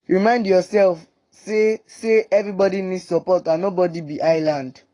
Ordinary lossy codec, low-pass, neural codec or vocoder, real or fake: AAC, 32 kbps; 10.8 kHz; codec, 44.1 kHz, 7.8 kbps, Pupu-Codec; fake